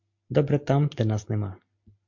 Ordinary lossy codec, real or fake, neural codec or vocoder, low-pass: MP3, 48 kbps; real; none; 7.2 kHz